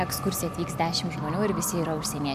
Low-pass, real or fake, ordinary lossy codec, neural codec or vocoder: 14.4 kHz; real; AAC, 96 kbps; none